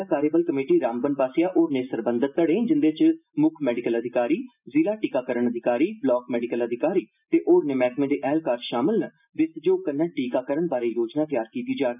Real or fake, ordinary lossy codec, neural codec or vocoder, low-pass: real; none; none; 3.6 kHz